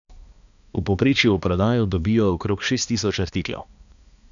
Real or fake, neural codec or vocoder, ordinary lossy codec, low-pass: fake; codec, 16 kHz, 2 kbps, X-Codec, HuBERT features, trained on balanced general audio; none; 7.2 kHz